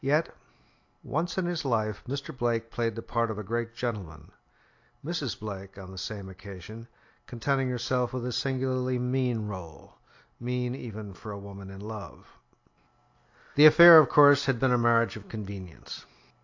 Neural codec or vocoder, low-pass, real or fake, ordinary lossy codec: none; 7.2 kHz; real; AAC, 48 kbps